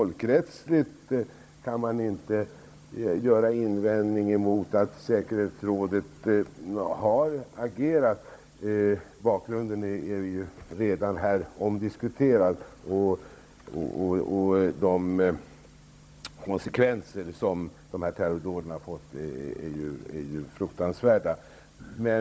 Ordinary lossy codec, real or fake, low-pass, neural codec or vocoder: none; fake; none; codec, 16 kHz, 16 kbps, FunCodec, trained on Chinese and English, 50 frames a second